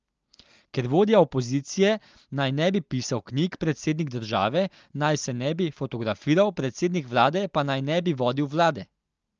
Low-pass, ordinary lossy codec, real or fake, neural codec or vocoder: 7.2 kHz; Opus, 24 kbps; real; none